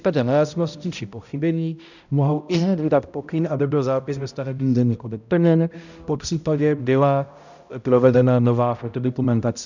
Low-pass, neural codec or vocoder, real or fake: 7.2 kHz; codec, 16 kHz, 0.5 kbps, X-Codec, HuBERT features, trained on balanced general audio; fake